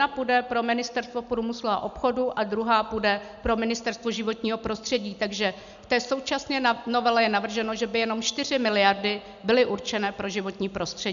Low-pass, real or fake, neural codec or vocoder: 7.2 kHz; real; none